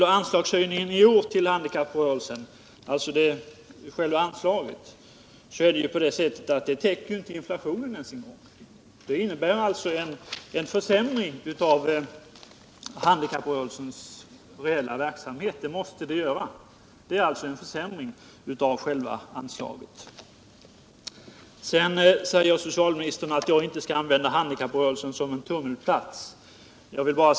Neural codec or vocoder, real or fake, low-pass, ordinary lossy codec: none; real; none; none